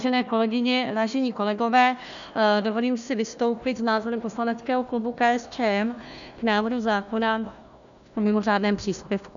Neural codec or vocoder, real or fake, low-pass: codec, 16 kHz, 1 kbps, FunCodec, trained on Chinese and English, 50 frames a second; fake; 7.2 kHz